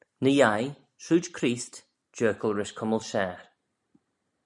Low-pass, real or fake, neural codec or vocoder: 10.8 kHz; real; none